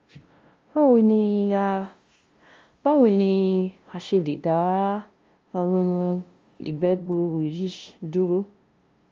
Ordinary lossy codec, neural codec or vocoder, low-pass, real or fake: Opus, 32 kbps; codec, 16 kHz, 0.5 kbps, FunCodec, trained on LibriTTS, 25 frames a second; 7.2 kHz; fake